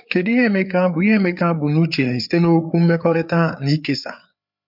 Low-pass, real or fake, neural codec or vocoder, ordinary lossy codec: 5.4 kHz; fake; codec, 16 kHz, 4 kbps, FreqCodec, larger model; MP3, 48 kbps